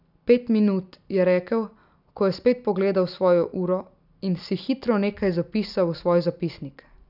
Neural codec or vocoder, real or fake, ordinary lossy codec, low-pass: none; real; none; 5.4 kHz